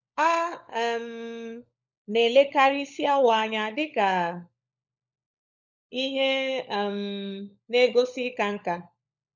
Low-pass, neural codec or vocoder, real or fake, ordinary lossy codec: 7.2 kHz; codec, 16 kHz, 16 kbps, FunCodec, trained on LibriTTS, 50 frames a second; fake; none